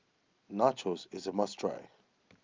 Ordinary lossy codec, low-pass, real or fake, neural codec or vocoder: Opus, 24 kbps; 7.2 kHz; real; none